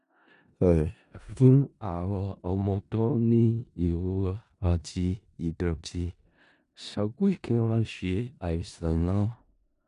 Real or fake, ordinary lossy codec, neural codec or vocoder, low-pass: fake; none; codec, 16 kHz in and 24 kHz out, 0.4 kbps, LongCat-Audio-Codec, four codebook decoder; 10.8 kHz